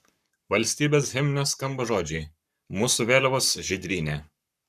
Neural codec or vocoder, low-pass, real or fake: codec, 44.1 kHz, 7.8 kbps, Pupu-Codec; 14.4 kHz; fake